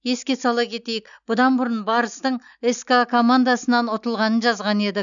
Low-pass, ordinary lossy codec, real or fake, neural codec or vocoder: 7.2 kHz; none; real; none